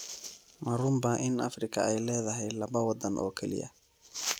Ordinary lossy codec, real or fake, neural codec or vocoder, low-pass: none; real; none; none